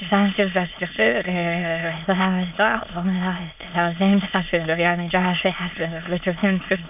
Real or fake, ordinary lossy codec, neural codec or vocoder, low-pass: fake; none; autoencoder, 22.05 kHz, a latent of 192 numbers a frame, VITS, trained on many speakers; 3.6 kHz